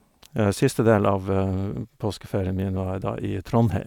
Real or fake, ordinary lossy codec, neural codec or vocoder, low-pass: real; none; none; 19.8 kHz